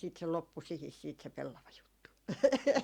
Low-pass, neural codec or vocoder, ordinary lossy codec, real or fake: 19.8 kHz; none; Opus, 64 kbps; real